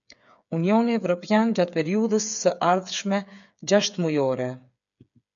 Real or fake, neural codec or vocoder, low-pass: fake; codec, 16 kHz, 8 kbps, FreqCodec, smaller model; 7.2 kHz